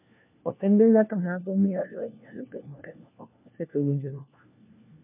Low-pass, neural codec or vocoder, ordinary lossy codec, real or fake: 3.6 kHz; codec, 16 kHz, 1 kbps, FunCodec, trained on LibriTTS, 50 frames a second; MP3, 32 kbps; fake